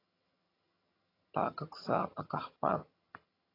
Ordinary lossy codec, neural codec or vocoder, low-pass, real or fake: AAC, 24 kbps; vocoder, 22.05 kHz, 80 mel bands, HiFi-GAN; 5.4 kHz; fake